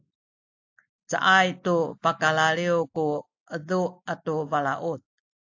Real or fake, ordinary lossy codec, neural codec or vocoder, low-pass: real; AAC, 48 kbps; none; 7.2 kHz